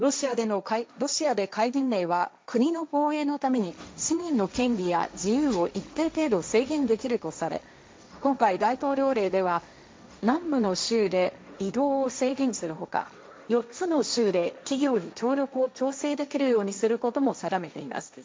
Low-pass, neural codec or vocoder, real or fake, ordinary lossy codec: none; codec, 16 kHz, 1.1 kbps, Voila-Tokenizer; fake; none